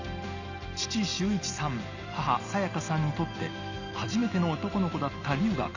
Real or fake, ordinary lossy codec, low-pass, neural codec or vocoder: real; none; 7.2 kHz; none